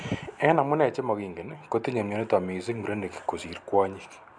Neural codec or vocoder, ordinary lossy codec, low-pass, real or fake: none; MP3, 96 kbps; 9.9 kHz; real